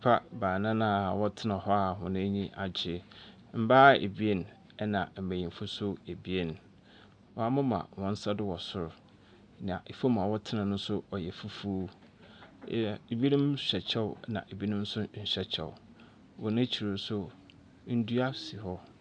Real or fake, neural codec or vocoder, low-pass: real; none; 9.9 kHz